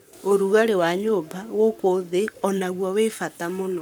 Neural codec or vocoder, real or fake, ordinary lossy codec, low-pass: codec, 44.1 kHz, 7.8 kbps, Pupu-Codec; fake; none; none